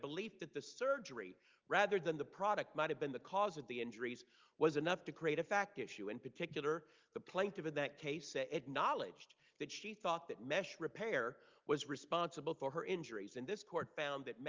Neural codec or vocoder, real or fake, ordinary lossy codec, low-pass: none; real; Opus, 32 kbps; 7.2 kHz